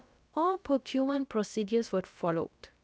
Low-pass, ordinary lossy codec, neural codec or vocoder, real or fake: none; none; codec, 16 kHz, about 1 kbps, DyCAST, with the encoder's durations; fake